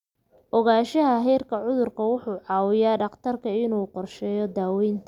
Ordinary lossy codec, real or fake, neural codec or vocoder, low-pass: none; real; none; 19.8 kHz